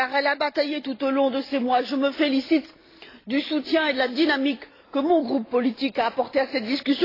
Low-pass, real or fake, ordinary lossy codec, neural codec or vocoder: 5.4 kHz; real; AAC, 24 kbps; none